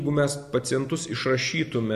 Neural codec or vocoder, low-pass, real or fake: none; 14.4 kHz; real